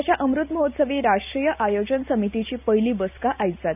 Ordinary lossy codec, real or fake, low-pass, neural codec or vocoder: none; real; 3.6 kHz; none